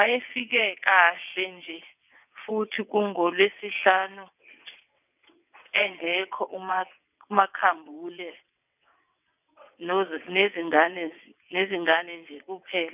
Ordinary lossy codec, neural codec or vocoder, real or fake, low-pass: none; vocoder, 22.05 kHz, 80 mel bands, WaveNeXt; fake; 3.6 kHz